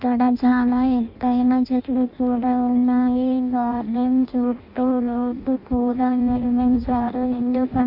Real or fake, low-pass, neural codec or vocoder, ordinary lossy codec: fake; 5.4 kHz; codec, 16 kHz in and 24 kHz out, 0.6 kbps, FireRedTTS-2 codec; Opus, 64 kbps